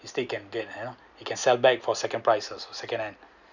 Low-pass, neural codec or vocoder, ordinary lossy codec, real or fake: 7.2 kHz; none; none; real